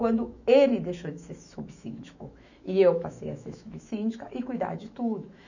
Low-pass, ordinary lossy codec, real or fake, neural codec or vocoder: 7.2 kHz; none; fake; autoencoder, 48 kHz, 128 numbers a frame, DAC-VAE, trained on Japanese speech